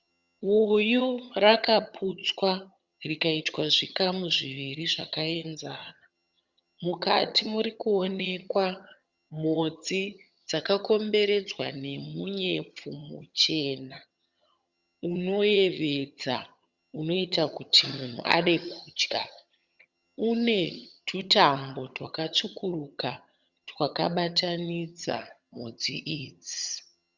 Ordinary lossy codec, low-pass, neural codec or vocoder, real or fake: Opus, 64 kbps; 7.2 kHz; vocoder, 22.05 kHz, 80 mel bands, HiFi-GAN; fake